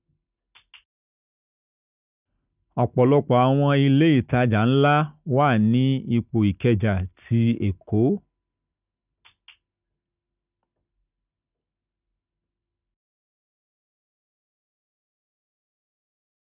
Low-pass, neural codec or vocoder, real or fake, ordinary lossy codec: 3.6 kHz; none; real; none